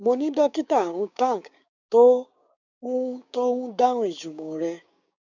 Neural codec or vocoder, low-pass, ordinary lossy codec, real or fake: codec, 16 kHz, 6 kbps, DAC; 7.2 kHz; none; fake